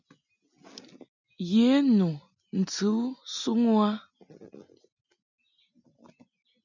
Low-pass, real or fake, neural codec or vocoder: 7.2 kHz; real; none